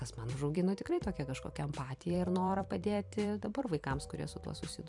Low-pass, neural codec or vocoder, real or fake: 10.8 kHz; vocoder, 44.1 kHz, 128 mel bands every 256 samples, BigVGAN v2; fake